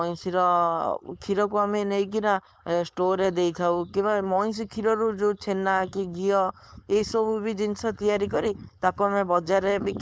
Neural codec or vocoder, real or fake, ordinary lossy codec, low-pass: codec, 16 kHz, 4.8 kbps, FACodec; fake; none; none